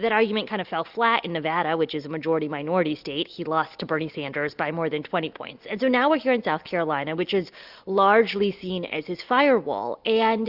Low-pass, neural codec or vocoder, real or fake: 5.4 kHz; none; real